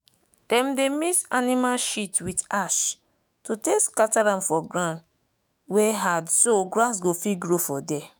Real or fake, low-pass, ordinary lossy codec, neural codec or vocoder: fake; none; none; autoencoder, 48 kHz, 128 numbers a frame, DAC-VAE, trained on Japanese speech